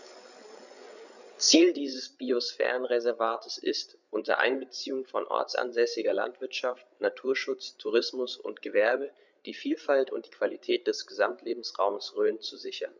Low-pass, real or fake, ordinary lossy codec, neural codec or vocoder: 7.2 kHz; fake; none; codec, 16 kHz, 8 kbps, FreqCodec, larger model